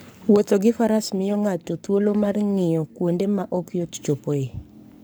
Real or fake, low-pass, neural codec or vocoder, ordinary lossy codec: fake; none; codec, 44.1 kHz, 7.8 kbps, Pupu-Codec; none